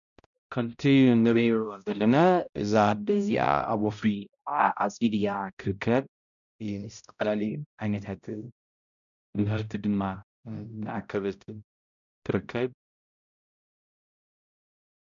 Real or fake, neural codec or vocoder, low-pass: fake; codec, 16 kHz, 0.5 kbps, X-Codec, HuBERT features, trained on balanced general audio; 7.2 kHz